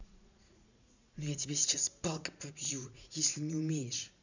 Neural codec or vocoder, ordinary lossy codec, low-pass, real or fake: vocoder, 44.1 kHz, 80 mel bands, Vocos; none; 7.2 kHz; fake